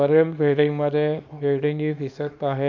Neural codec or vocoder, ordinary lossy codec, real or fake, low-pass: codec, 24 kHz, 0.9 kbps, WavTokenizer, small release; none; fake; 7.2 kHz